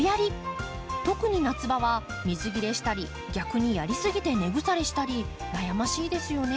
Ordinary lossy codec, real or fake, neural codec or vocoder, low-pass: none; real; none; none